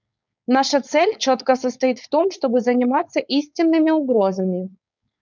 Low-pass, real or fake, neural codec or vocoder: 7.2 kHz; fake; codec, 16 kHz, 4.8 kbps, FACodec